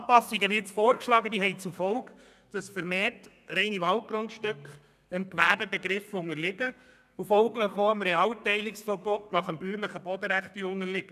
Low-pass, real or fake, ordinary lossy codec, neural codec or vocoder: 14.4 kHz; fake; none; codec, 32 kHz, 1.9 kbps, SNAC